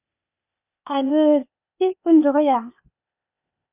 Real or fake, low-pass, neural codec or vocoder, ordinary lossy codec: fake; 3.6 kHz; codec, 16 kHz, 0.8 kbps, ZipCodec; none